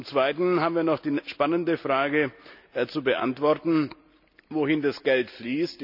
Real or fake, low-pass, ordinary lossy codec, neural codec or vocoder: real; 5.4 kHz; none; none